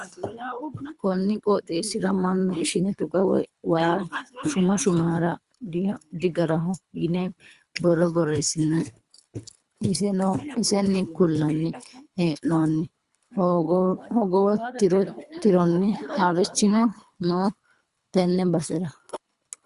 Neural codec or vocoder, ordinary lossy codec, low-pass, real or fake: codec, 24 kHz, 3 kbps, HILCodec; Opus, 64 kbps; 10.8 kHz; fake